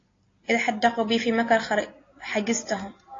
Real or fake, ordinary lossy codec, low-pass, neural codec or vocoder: real; AAC, 32 kbps; 7.2 kHz; none